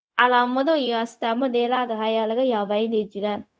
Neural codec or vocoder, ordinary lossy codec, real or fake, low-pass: codec, 16 kHz, 0.4 kbps, LongCat-Audio-Codec; none; fake; none